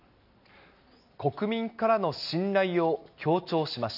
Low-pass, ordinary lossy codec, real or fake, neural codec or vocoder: 5.4 kHz; none; real; none